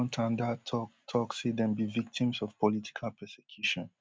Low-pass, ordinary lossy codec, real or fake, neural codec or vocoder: none; none; real; none